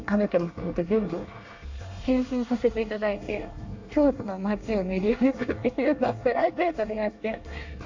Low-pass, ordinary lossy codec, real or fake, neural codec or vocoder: 7.2 kHz; AAC, 48 kbps; fake; codec, 24 kHz, 1 kbps, SNAC